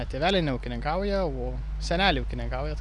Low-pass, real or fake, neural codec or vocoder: 10.8 kHz; real; none